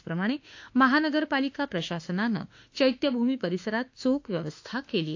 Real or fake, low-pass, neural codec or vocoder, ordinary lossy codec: fake; 7.2 kHz; autoencoder, 48 kHz, 32 numbers a frame, DAC-VAE, trained on Japanese speech; AAC, 48 kbps